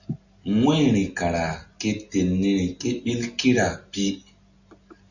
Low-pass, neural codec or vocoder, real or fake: 7.2 kHz; none; real